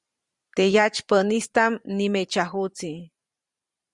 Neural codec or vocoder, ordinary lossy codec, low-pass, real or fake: vocoder, 44.1 kHz, 128 mel bands every 512 samples, BigVGAN v2; Opus, 64 kbps; 10.8 kHz; fake